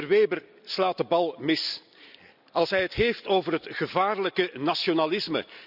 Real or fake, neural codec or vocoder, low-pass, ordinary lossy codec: real; none; 5.4 kHz; none